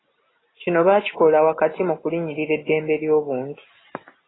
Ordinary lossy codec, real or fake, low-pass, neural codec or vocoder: AAC, 16 kbps; real; 7.2 kHz; none